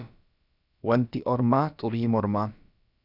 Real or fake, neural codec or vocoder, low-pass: fake; codec, 16 kHz, about 1 kbps, DyCAST, with the encoder's durations; 5.4 kHz